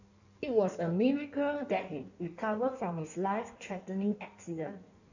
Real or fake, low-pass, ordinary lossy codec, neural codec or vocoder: fake; 7.2 kHz; none; codec, 16 kHz in and 24 kHz out, 1.1 kbps, FireRedTTS-2 codec